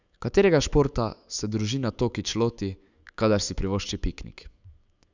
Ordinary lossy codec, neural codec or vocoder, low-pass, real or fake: Opus, 64 kbps; autoencoder, 48 kHz, 128 numbers a frame, DAC-VAE, trained on Japanese speech; 7.2 kHz; fake